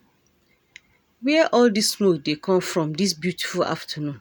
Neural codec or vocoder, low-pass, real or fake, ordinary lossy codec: none; none; real; none